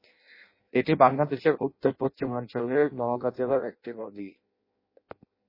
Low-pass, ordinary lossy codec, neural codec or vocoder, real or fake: 5.4 kHz; MP3, 24 kbps; codec, 16 kHz in and 24 kHz out, 0.6 kbps, FireRedTTS-2 codec; fake